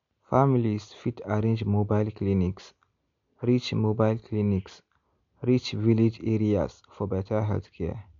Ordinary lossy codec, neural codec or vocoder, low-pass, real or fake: MP3, 64 kbps; none; 7.2 kHz; real